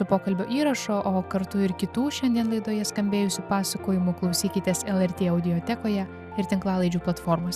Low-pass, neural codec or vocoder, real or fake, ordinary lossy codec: 14.4 kHz; none; real; Opus, 64 kbps